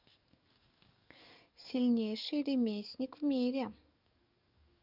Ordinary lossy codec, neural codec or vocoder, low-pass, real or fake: none; codec, 44.1 kHz, 7.8 kbps, DAC; 5.4 kHz; fake